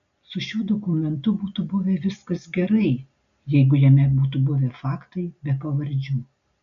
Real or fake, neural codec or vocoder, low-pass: real; none; 7.2 kHz